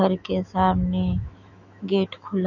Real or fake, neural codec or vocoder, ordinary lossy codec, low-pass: fake; autoencoder, 48 kHz, 128 numbers a frame, DAC-VAE, trained on Japanese speech; none; 7.2 kHz